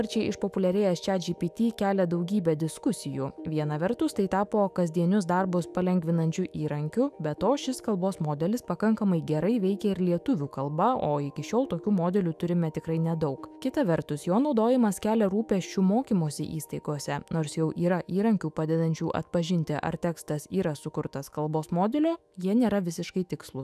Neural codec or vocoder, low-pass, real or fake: autoencoder, 48 kHz, 128 numbers a frame, DAC-VAE, trained on Japanese speech; 14.4 kHz; fake